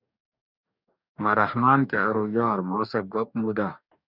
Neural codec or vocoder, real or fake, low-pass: codec, 44.1 kHz, 2.6 kbps, DAC; fake; 5.4 kHz